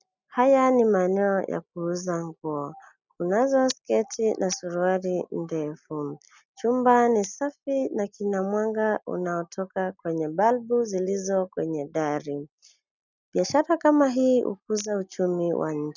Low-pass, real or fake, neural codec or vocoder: 7.2 kHz; real; none